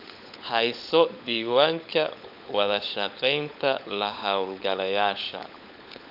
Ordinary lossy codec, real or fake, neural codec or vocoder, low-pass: none; fake; codec, 16 kHz, 16 kbps, FunCodec, trained on LibriTTS, 50 frames a second; 5.4 kHz